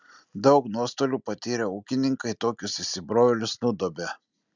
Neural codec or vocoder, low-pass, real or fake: none; 7.2 kHz; real